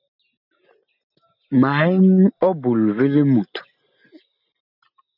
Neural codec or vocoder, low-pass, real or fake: none; 5.4 kHz; real